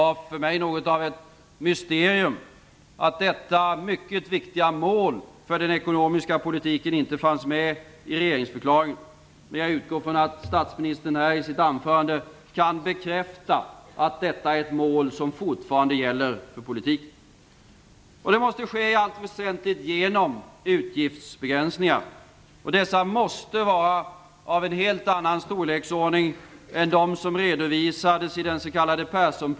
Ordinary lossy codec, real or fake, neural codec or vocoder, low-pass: none; real; none; none